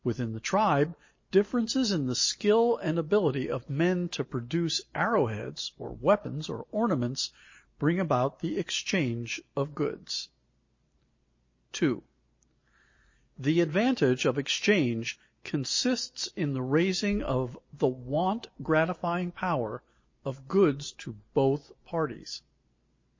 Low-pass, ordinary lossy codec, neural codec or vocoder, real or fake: 7.2 kHz; MP3, 32 kbps; none; real